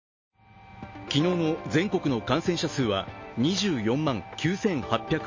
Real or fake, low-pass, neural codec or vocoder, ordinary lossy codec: real; 7.2 kHz; none; MP3, 32 kbps